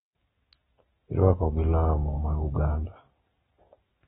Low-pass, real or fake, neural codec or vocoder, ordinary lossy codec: 19.8 kHz; real; none; AAC, 16 kbps